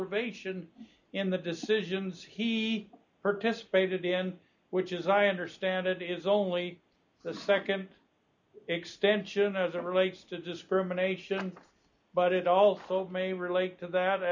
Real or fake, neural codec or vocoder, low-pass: real; none; 7.2 kHz